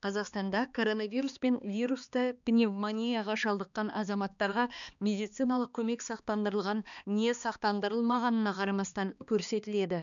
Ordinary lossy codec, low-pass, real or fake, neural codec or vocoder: none; 7.2 kHz; fake; codec, 16 kHz, 2 kbps, X-Codec, HuBERT features, trained on balanced general audio